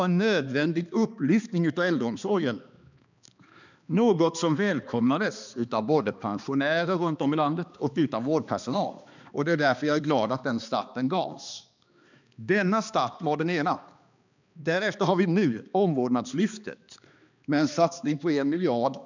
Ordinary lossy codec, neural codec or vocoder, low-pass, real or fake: none; codec, 16 kHz, 2 kbps, X-Codec, HuBERT features, trained on balanced general audio; 7.2 kHz; fake